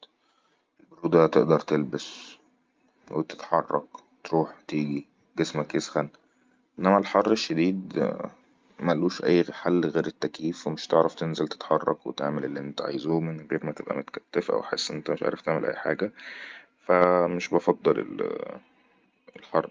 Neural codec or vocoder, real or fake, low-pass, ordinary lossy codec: none; real; 7.2 kHz; Opus, 24 kbps